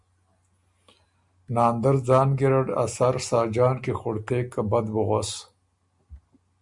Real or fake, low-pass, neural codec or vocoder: real; 10.8 kHz; none